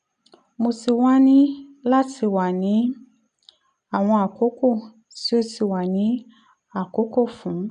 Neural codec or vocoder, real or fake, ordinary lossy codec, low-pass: none; real; none; 9.9 kHz